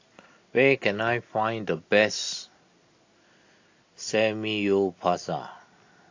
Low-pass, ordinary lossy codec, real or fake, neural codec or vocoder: 7.2 kHz; AAC, 48 kbps; real; none